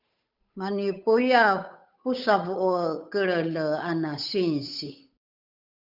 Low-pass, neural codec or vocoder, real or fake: 5.4 kHz; codec, 16 kHz, 8 kbps, FunCodec, trained on Chinese and English, 25 frames a second; fake